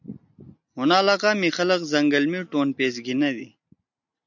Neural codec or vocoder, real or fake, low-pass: none; real; 7.2 kHz